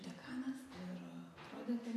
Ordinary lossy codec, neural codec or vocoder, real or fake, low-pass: MP3, 64 kbps; none; real; 14.4 kHz